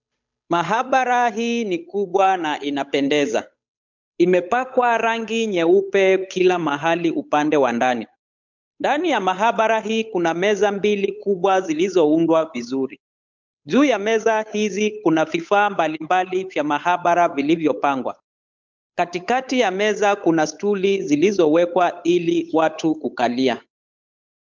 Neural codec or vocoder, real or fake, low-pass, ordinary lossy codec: codec, 16 kHz, 8 kbps, FunCodec, trained on Chinese and English, 25 frames a second; fake; 7.2 kHz; MP3, 64 kbps